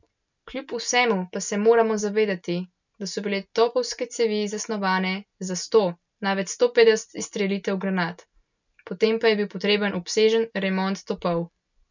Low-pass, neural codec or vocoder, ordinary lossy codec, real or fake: 7.2 kHz; none; none; real